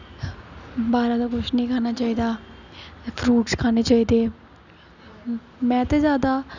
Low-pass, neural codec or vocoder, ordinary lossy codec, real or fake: 7.2 kHz; none; none; real